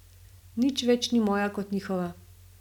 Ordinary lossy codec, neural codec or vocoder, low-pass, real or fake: none; none; 19.8 kHz; real